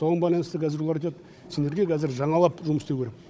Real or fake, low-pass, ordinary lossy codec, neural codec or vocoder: fake; none; none; codec, 16 kHz, 16 kbps, FunCodec, trained on Chinese and English, 50 frames a second